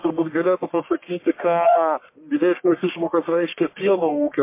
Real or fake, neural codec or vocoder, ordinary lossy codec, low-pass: fake; codec, 44.1 kHz, 1.7 kbps, Pupu-Codec; MP3, 24 kbps; 3.6 kHz